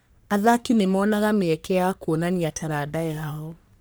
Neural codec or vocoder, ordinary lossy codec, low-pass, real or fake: codec, 44.1 kHz, 3.4 kbps, Pupu-Codec; none; none; fake